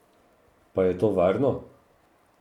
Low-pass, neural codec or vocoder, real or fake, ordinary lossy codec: 19.8 kHz; none; real; none